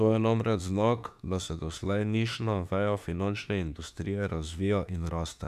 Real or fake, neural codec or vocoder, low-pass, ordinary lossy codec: fake; autoencoder, 48 kHz, 32 numbers a frame, DAC-VAE, trained on Japanese speech; 14.4 kHz; none